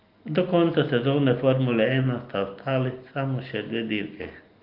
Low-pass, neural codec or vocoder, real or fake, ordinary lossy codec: 5.4 kHz; none; real; Opus, 32 kbps